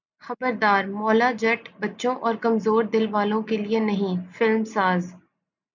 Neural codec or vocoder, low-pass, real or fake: none; 7.2 kHz; real